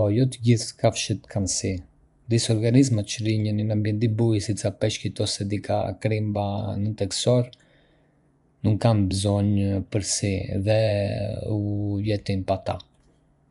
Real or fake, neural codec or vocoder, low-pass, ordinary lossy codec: fake; vocoder, 24 kHz, 100 mel bands, Vocos; 10.8 kHz; none